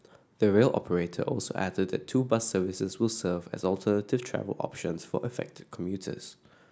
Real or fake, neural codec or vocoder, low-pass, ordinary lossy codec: real; none; none; none